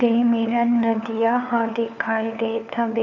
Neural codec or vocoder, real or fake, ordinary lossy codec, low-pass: codec, 16 kHz, 4 kbps, FunCodec, trained on LibriTTS, 50 frames a second; fake; none; 7.2 kHz